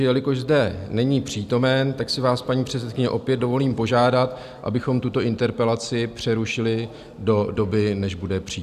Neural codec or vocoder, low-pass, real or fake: none; 14.4 kHz; real